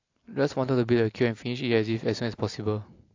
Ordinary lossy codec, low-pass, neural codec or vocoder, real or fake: AAC, 48 kbps; 7.2 kHz; none; real